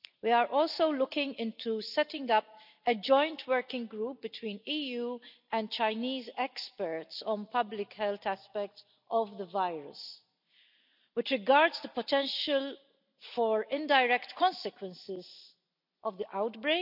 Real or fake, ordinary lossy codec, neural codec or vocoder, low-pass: real; none; none; 5.4 kHz